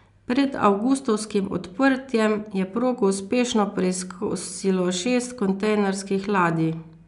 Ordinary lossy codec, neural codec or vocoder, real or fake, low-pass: MP3, 96 kbps; none; real; 10.8 kHz